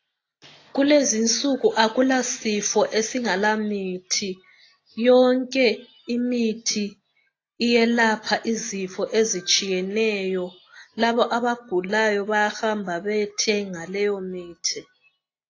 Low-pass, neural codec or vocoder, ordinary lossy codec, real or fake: 7.2 kHz; none; AAC, 32 kbps; real